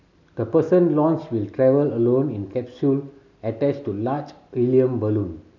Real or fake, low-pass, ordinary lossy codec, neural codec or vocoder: real; 7.2 kHz; none; none